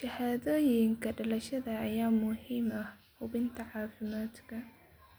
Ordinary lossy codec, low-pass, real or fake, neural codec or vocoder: none; none; real; none